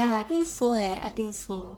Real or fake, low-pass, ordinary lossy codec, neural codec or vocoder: fake; none; none; codec, 44.1 kHz, 1.7 kbps, Pupu-Codec